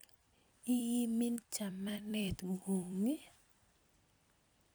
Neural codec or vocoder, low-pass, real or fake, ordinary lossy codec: vocoder, 44.1 kHz, 128 mel bands every 256 samples, BigVGAN v2; none; fake; none